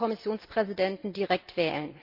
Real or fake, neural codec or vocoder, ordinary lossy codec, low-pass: real; none; Opus, 24 kbps; 5.4 kHz